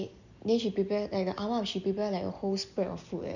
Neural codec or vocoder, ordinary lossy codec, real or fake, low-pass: none; none; real; 7.2 kHz